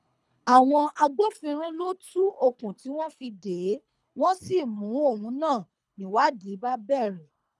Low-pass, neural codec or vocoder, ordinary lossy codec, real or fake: none; codec, 24 kHz, 3 kbps, HILCodec; none; fake